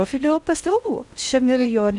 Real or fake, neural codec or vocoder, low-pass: fake; codec, 16 kHz in and 24 kHz out, 0.6 kbps, FocalCodec, streaming, 4096 codes; 10.8 kHz